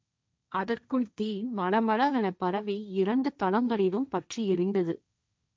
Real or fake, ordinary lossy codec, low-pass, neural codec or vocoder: fake; none; none; codec, 16 kHz, 1.1 kbps, Voila-Tokenizer